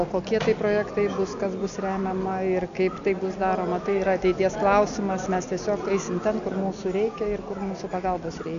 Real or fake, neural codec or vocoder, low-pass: real; none; 7.2 kHz